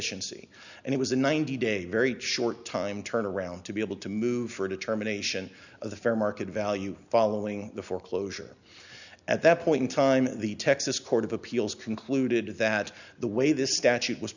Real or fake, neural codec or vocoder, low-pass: real; none; 7.2 kHz